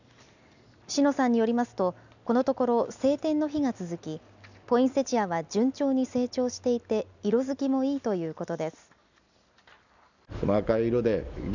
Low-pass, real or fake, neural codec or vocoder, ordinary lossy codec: 7.2 kHz; real; none; none